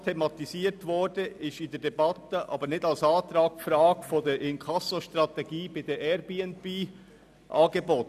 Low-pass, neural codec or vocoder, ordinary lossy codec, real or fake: 14.4 kHz; none; none; real